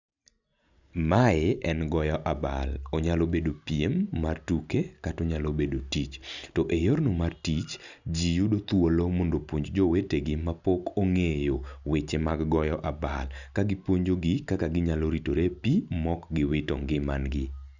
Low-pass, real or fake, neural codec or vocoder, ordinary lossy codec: 7.2 kHz; real; none; none